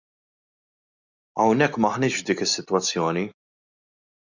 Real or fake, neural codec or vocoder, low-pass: real; none; 7.2 kHz